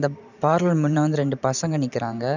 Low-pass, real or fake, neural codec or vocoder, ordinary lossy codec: 7.2 kHz; fake; vocoder, 44.1 kHz, 128 mel bands every 512 samples, BigVGAN v2; none